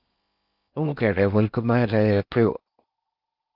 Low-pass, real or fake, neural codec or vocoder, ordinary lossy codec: 5.4 kHz; fake; codec, 16 kHz in and 24 kHz out, 0.6 kbps, FocalCodec, streaming, 4096 codes; Opus, 24 kbps